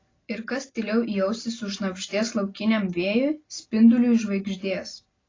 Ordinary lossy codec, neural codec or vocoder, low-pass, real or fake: AAC, 32 kbps; none; 7.2 kHz; real